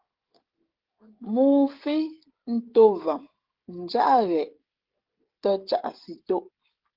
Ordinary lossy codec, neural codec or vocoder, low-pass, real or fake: Opus, 16 kbps; codec, 16 kHz, 16 kbps, FreqCodec, smaller model; 5.4 kHz; fake